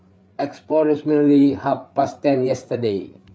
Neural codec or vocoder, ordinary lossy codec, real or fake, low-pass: codec, 16 kHz, 8 kbps, FreqCodec, larger model; none; fake; none